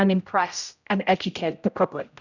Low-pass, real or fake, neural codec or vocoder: 7.2 kHz; fake; codec, 16 kHz, 0.5 kbps, X-Codec, HuBERT features, trained on general audio